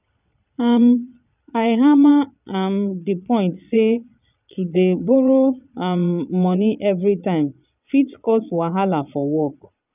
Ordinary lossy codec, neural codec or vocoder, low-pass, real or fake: none; vocoder, 22.05 kHz, 80 mel bands, Vocos; 3.6 kHz; fake